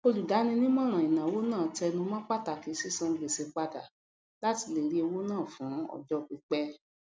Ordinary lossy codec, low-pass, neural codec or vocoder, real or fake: none; none; none; real